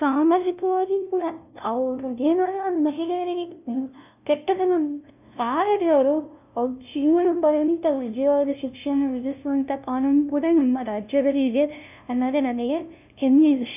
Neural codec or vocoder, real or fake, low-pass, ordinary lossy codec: codec, 16 kHz, 0.5 kbps, FunCodec, trained on LibriTTS, 25 frames a second; fake; 3.6 kHz; none